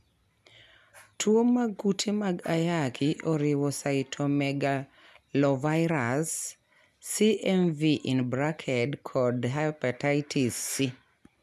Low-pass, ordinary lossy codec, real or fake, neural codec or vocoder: 14.4 kHz; none; real; none